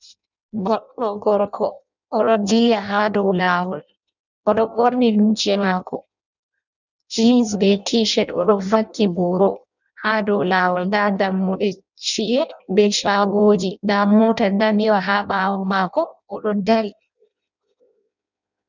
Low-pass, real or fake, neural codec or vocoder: 7.2 kHz; fake; codec, 16 kHz in and 24 kHz out, 0.6 kbps, FireRedTTS-2 codec